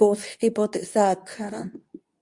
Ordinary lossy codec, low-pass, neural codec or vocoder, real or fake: Opus, 64 kbps; 10.8 kHz; codec, 24 kHz, 0.9 kbps, WavTokenizer, medium speech release version 2; fake